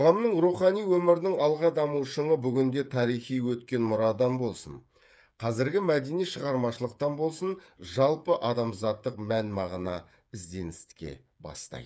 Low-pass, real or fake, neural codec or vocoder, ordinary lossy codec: none; fake; codec, 16 kHz, 8 kbps, FreqCodec, smaller model; none